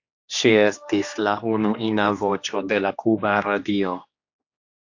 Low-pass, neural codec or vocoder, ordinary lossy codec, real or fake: 7.2 kHz; codec, 16 kHz, 2 kbps, X-Codec, HuBERT features, trained on general audio; AAC, 48 kbps; fake